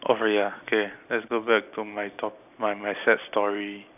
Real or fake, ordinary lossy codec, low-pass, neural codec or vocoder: real; none; 3.6 kHz; none